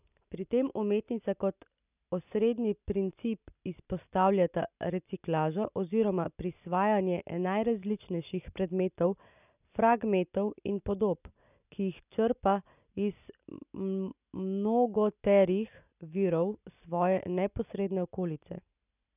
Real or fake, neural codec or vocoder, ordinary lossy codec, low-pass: real; none; none; 3.6 kHz